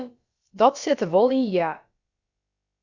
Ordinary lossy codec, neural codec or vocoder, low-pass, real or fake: Opus, 64 kbps; codec, 16 kHz, about 1 kbps, DyCAST, with the encoder's durations; 7.2 kHz; fake